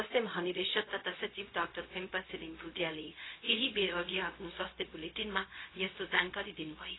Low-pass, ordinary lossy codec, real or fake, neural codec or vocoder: 7.2 kHz; AAC, 16 kbps; fake; codec, 16 kHz, 0.4 kbps, LongCat-Audio-Codec